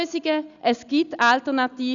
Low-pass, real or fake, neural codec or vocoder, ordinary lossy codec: 7.2 kHz; real; none; none